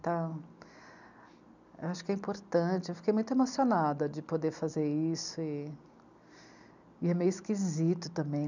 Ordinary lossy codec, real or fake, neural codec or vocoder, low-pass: none; real; none; 7.2 kHz